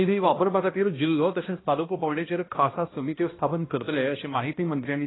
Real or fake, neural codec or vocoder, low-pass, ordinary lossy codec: fake; codec, 16 kHz, 1 kbps, X-Codec, HuBERT features, trained on balanced general audio; 7.2 kHz; AAC, 16 kbps